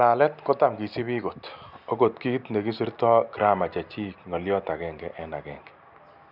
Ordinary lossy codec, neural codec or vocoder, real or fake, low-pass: none; none; real; 5.4 kHz